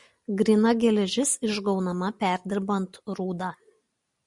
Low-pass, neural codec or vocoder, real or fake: 10.8 kHz; none; real